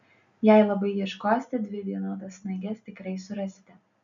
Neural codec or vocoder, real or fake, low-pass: none; real; 7.2 kHz